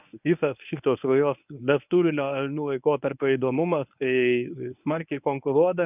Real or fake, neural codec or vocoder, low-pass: fake; codec, 24 kHz, 0.9 kbps, WavTokenizer, medium speech release version 1; 3.6 kHz